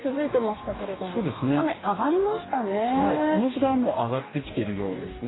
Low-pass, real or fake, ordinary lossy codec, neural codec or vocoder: 7.2 kHz; fake; AAC, 16 kbps; codec, 44.1 kHz, 2.6 kbps, DAC